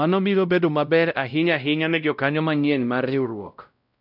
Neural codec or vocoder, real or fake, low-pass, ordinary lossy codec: codec, 16 kHz, 0.5 kbps, X-Codec, WavLM features, trained on Multilingual LibriSpeech; fake; 5.4 kHz; none